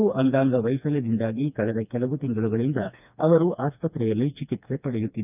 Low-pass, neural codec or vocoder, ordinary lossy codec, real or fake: 3.6 kHz; codec, 16 kHz, 2 kbps, FreqCodec, smaller model; none; fake